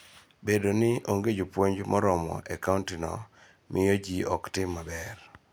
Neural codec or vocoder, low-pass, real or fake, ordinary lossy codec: none; none; real; none